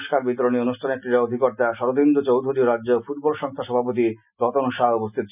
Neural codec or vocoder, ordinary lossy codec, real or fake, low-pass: none; none; real; 3.6 kHz